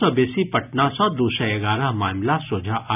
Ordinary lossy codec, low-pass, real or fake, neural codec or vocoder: none; 3.6 kHz; real; none